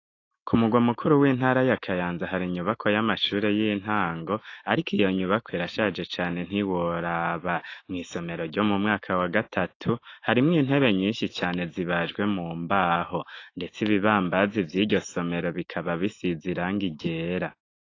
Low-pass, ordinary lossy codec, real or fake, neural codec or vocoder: 7.2 kHz; AAC, 32 kbps; fake; autoencoder, 48 kHz, 128 numbers a frame, DAC-VAE, trained on Japanese speech